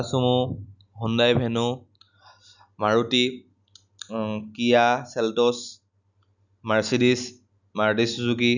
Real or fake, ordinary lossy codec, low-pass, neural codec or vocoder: real; none; 7.2 kHz; none